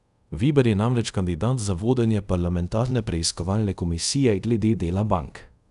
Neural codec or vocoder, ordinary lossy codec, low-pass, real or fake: codec, 24 kHz, 0.5 kbps, DualCodec; none; 10.8 kHz; fake